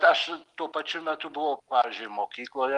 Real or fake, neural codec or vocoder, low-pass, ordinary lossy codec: real; none; 10.8 kHz; Opus, 32 kbps